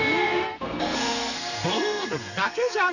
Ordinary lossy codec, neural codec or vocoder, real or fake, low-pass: none; codec, 32 kHz, 1.9 kbps, SNAC; fake; 7.2 kHz